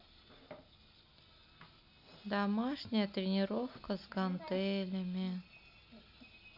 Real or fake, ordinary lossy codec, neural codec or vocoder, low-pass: real; none; none; 5.4 kHz